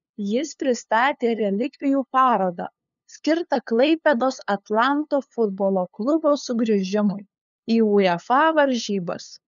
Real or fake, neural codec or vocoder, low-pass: fake; codec, 16 kHz, 2 kbps, FunCodec, trained on LibriTTS, 25 frames a second; 7.2 kHz